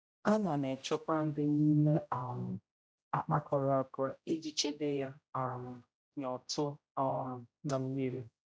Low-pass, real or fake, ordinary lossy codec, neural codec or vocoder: none; fake; none; codec, 16 kHz, 0.5 kbps, X-Codec, HuBERT features, trained on general audio